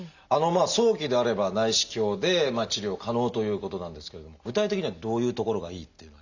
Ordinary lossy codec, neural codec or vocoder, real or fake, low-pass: none; none; real; 7.2 kHz